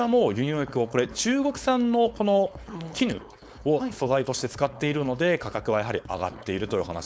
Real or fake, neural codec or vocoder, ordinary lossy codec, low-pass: fake; codec, 16 kHz, 4.8 kbps, FACodec; none; none